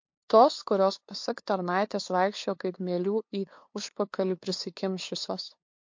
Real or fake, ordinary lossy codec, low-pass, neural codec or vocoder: fake; MP3, 48 kbps; 7.2 kHz; codec, 16 kHz, 2 kbps, FunCodec, trained on LibriTTS, 25 frames a second